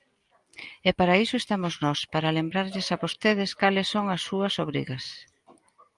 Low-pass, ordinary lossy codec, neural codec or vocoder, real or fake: 10.8 kHz; Opus, 32 kbps; none; real